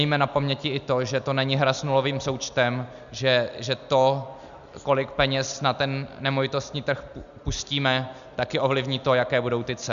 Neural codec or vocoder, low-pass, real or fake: none; 7.2 kHz; real